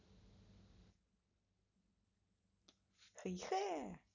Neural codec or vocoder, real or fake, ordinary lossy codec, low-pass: none; real; none; 7.2 kHz